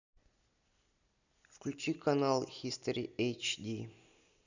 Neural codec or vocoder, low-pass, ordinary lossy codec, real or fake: none; 7.2 kHz; none; real